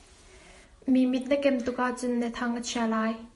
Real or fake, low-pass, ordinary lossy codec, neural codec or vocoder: fake; 14.4 kHz; MP3, 48 kbps; vocoder, 48 kHz, 128 mel bands, Vocos